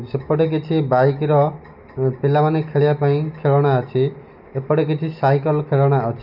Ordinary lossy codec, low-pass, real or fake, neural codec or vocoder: none; 5.4 kHz; real; none